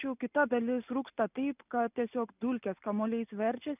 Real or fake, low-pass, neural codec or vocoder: real; 3.6 kHz; none